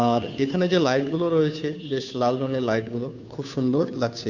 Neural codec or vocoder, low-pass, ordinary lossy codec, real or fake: codec, 16 kHz, 2 kbps, FunCodec, trained on Chinese and English, 25 frames a second; 7.2 kHz; none; fake